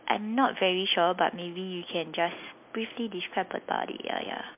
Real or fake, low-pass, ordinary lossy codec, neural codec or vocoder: real; 3.6 kHz; MP3, 32 kbps; none